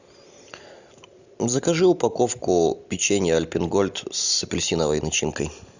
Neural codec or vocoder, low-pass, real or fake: none; 7.2 kHz; real